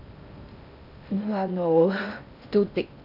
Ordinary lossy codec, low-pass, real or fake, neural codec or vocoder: none; 5.4 kHz; fake; codec, 16 kHz in and 24 kHz out, 0.6 kbps, FocalCodec, streaming, 4096 codes